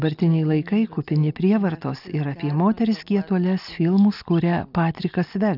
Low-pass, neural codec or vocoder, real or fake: 5.4 kHz; none; real